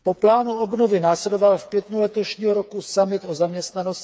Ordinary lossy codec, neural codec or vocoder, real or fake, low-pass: none; codec, 16 kHz, 4 kbps, FreqCodec, smaller model; fake; none